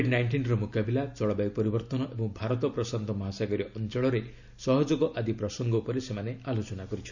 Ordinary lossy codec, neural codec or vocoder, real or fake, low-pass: none; none; real; 7.2 kHz